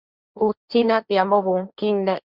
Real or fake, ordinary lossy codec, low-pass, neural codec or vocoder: fake; Opus, 64 kbps; 5.4 kHz; codec, 16 kHz, 1.1 kbps, Voila-Tokenizer